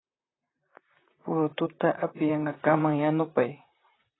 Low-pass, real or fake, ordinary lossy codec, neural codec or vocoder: 7.2 kHz; fake; AAC, 16 kbps; vocoder, 44.1 kHz, 128 mel bands, Pupu-Vocoder